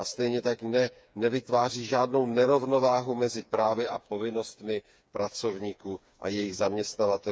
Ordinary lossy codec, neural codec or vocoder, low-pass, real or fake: none; codec, 16 kHz, 4 kbps, FreqCodec, smaller model; none; fake